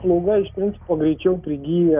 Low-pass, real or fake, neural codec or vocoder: 3.6 kHz; real; none